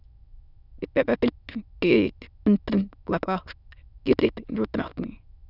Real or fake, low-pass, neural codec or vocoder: fake; 5.4 kHz; autoencoder, 22.05 kHz, a latent of 192 numbers a frame, VITS, trained on many speakers